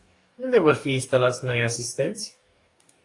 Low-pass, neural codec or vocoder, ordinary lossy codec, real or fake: 10.8 kHz; codec, 44.1 kHz, 2.6 kbps, DAC; AAC, 48 kbps; fake